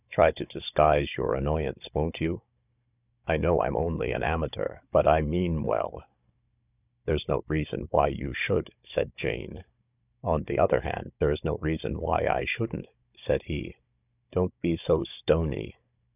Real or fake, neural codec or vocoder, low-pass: fake; codec, 16 kHz, 16 kbps, FunCodec, trained on Chinese and English, 50 frames a second; 3.6 kHz